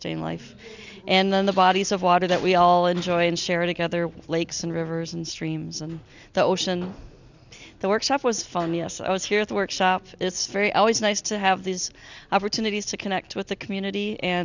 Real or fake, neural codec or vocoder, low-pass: real; none; 7.2 kHz